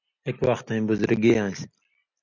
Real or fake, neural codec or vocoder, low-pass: real; none; 7.2 kHz